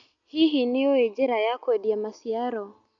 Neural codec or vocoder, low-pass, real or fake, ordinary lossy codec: none; 7.2 kHz; real; none